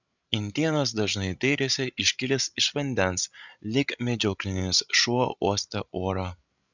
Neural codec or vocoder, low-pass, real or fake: codec, 16 kHz, 16 kbps, FreqCodec, larger model; 7.2 kHz; fake